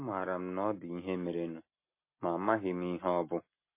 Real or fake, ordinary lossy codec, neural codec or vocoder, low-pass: real; MP3, 24 kbps; none; 3.6 kHz